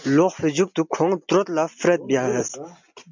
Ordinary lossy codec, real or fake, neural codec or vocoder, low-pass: MP3, 64 kbps; real; none; 7.2 kHz